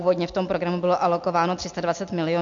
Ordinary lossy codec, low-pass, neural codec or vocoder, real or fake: MP3, 48 kbps; 7.2 kHz; none; real